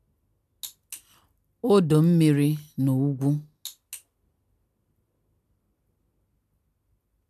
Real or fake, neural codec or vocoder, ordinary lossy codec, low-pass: real; none; MP3, 96 kbps; 14.4 kHz